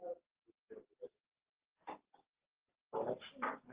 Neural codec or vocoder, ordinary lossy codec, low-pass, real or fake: none; Opus, 16 kbps; 3.6 kHz; real